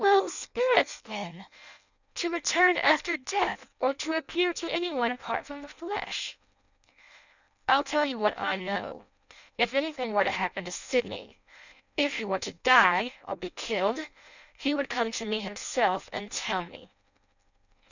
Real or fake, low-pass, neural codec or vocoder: fake; 7.2 kHz; codec, 16 kHz in and 24 kHz out, 0.6 kbps, FireRedTTS-2 codec